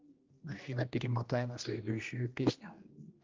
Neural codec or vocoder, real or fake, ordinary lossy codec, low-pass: codec, 16 kHz, 1 kbps, X-Codec, HuBERT features, trained on general audio; fake; Opus, 32 kbps; 7.2 kHz